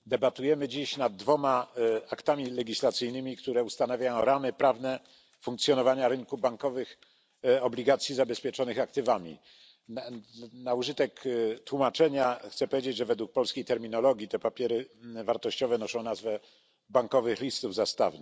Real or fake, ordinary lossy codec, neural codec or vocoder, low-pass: real; none; none; none